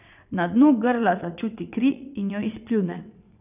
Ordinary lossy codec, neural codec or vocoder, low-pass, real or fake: none; vocoder, 22.05 kHz, 80 mel bands, WaveNeXt; 3.6 kHz; fake